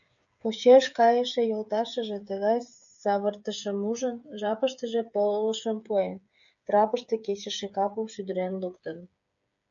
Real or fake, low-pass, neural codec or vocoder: fake; 7.2 kHz; codec, 16 kHz, 8 kbps, FreqCodec, smaller model